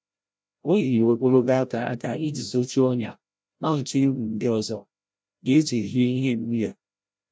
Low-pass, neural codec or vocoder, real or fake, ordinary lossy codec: none; codec, 16 kHz, 0.5 kbps, FreqCodec, larger model; fake; none